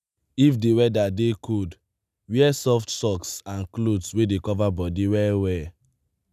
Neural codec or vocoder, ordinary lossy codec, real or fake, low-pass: none; none; real; 14.4 kHz